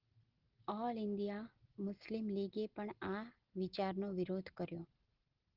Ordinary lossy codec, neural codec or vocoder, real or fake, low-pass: Opus, 16 kbps; none; real; 5.4 kHz